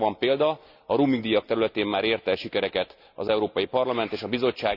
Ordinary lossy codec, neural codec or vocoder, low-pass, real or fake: none; none; 5.4 kHz; real